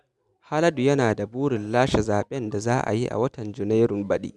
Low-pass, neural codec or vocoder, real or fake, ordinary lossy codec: none; none; real; none